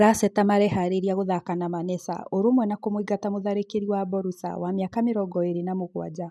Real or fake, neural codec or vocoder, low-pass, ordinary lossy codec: real; none; none; none